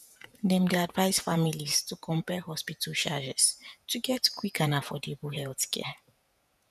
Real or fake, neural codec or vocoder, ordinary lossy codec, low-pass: fake; vocoder, 48 kHz, 128 mel bands, Vocos; none; 14.4 kHz